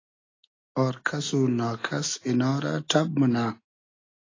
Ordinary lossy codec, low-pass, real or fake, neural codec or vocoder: AAC, 32 kbps; 7.2 kHz; real; none